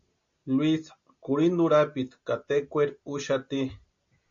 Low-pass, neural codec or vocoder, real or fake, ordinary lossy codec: 7.2 kHz; none; real; MP3, 48 kbps